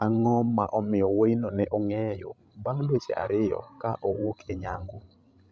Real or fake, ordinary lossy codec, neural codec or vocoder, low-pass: fake; none; codec, 16 kHz, 16 kbps, FreqCodec, larger model; none